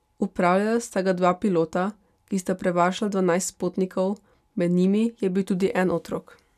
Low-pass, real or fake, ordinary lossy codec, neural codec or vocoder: 14.4 kHz; real; none; none